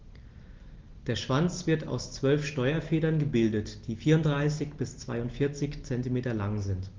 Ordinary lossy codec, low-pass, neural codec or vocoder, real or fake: Opus, 16 kbps; 7.2 kHz; none; real